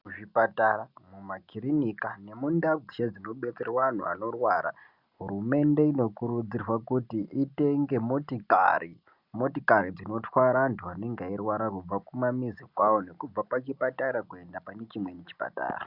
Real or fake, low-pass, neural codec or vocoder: real; 5.4 kHz; none